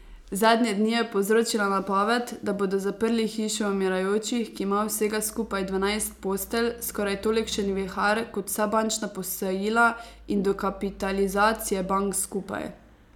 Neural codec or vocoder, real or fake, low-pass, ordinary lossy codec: none; real; 19.8 kHz; none